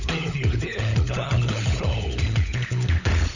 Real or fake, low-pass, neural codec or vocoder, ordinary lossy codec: fake; 7.2 kHz; codec, 16 kHz, 16 kbps, FunCodec, trained on LibriTTS, 50 frames a second; none